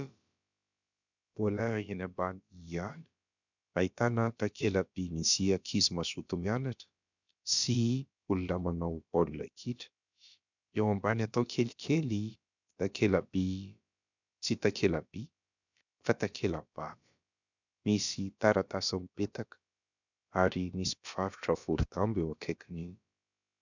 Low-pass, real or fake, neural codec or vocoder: 7.2 kHz; fake; codec, 16 kHz, about 1 kbps, DyCAST, with the encoder's durations